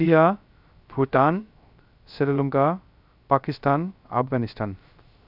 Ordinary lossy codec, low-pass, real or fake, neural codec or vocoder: none; 5.4 kHz; fake; codec, 16 kHz, 0.3 kbps, FocalCodec